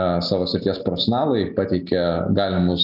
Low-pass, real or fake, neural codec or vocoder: 5.4 kHz; real; none